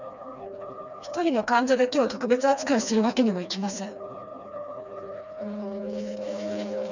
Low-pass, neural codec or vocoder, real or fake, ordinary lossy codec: 7.2 kHz; codec, 16 kHz, 2 kbps, FreqCodec, smaller model; fake; none